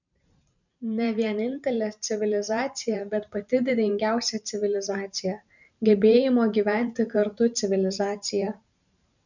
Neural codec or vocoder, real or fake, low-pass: vocoder, 44.1 kHz, 128 mel bands every 512 samples, BigVGAN v2; fake; 7.2 kHz